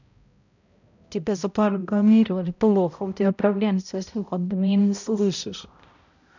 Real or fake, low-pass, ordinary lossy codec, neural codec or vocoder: fake; 7.2 kHz; none; codec, 16 kHz, 0.5 kbps, X-Codec, HuBERT features, trained on balanced general audio